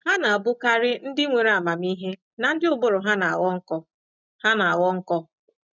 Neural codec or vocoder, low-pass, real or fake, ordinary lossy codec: none; 7.2 kHz; real; none